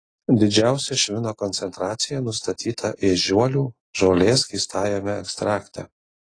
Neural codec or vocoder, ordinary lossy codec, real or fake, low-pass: none; AAC, 32 kbps; real; 9.9 kHz